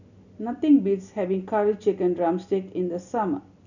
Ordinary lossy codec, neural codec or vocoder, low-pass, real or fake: none; none; 7.2 kHz; real